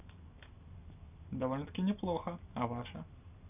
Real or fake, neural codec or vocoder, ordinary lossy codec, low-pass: real; none; none; 3.6 kHz